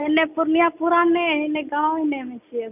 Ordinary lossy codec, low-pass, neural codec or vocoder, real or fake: none; 3.6 kHz; none; real